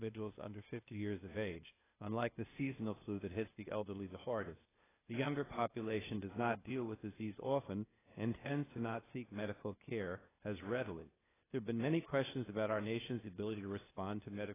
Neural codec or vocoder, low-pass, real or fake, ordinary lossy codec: codec, 16 kHz, 0.8 kbps, ZipCodec; 3.6 kHz; fake; AAC, 16 kbps